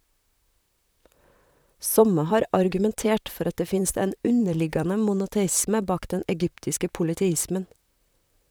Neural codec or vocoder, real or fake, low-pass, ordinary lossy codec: vocoder, 44.1 kHz, 128 mel bands, Pupu-Vocoder; fake; none; none